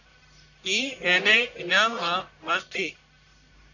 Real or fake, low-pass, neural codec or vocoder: fake; 7.2 kHz; codec, 44.1 kHz, 1.7 kbps, Pupu-Codec